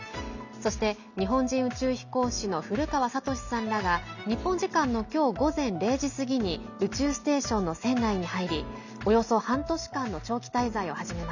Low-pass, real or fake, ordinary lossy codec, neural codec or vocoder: 7.2 kHz; real; none; none